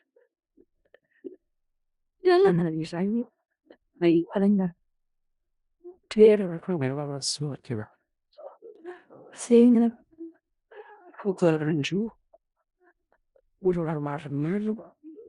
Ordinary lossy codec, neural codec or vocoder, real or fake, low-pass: Opus, 64 kbps; codec, 16 kHz in and 24 kHz out, 0.4 kbps, LongCat-Audio-Codec, four codebook decoder; fake; 10.8 kHz